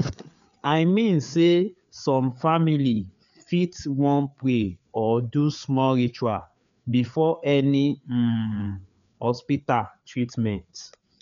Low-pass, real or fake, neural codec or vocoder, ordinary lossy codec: 7.2 kHz; fake; codec, 16 kHz, 4 kbps, FreqCodec, larger model; none